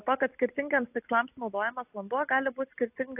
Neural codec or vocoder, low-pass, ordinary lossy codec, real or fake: none; 3.6 kHz; AAC, 24 kbps; real